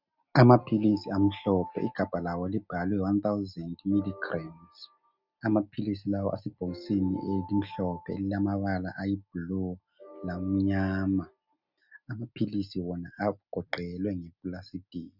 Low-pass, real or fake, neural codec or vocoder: 5.4 kHz; real; none